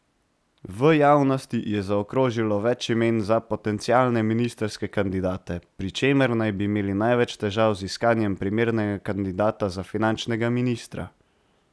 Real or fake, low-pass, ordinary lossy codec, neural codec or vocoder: real; none; none; none